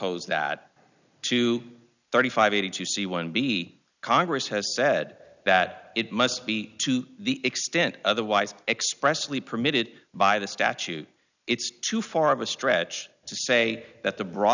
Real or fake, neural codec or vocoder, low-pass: real; none; 7.2 kHz